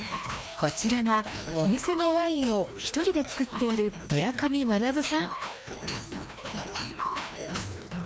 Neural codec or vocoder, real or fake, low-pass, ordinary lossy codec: codec, 16 kHz, 1 kbps, FreqCodec, larger model; fake; none; none